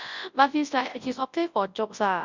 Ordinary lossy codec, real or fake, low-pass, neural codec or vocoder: Opus, 64 kbps; fake; 7.2 kHz; codec, 24 kHz, 0.9 kbps, WavTokenizer, large speech release